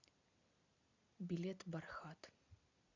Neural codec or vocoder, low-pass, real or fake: none; 7.2 kHz; real